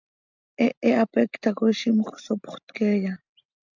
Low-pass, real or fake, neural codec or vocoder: 7.2 kHz; real; none